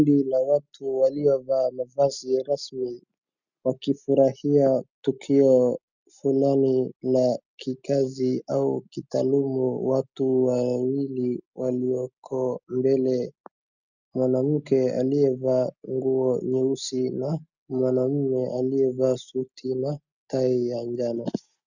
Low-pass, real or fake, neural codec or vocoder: 7.2 kHz; real; none